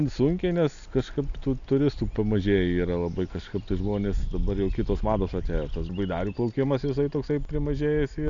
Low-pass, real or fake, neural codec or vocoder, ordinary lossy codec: 7.2 kHz; real; none; MP3, 96 kbps